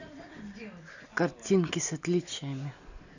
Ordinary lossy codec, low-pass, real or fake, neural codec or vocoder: none; 7.2 kHz; real; none